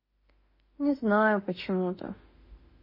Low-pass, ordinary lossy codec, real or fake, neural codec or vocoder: 5.4 kHz; MP3, 24 kbps; fake; autoencoder, 48 kHz, 32 numbers a frame, DAC-VAE, trained on Japanese speech